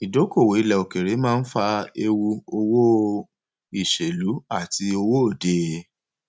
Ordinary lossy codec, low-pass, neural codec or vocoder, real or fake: none; none; none; real